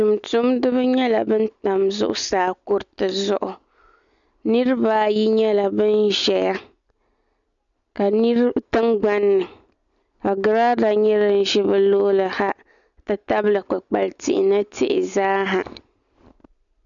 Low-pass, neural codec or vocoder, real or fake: 7.2 kHz; none; real